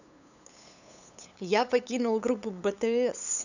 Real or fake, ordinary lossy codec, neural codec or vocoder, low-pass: fake; none; codec, 16 kHz, 8 kbps, FunCodec, trained on LibriTTS, 25 frames a second; 7.2 kHz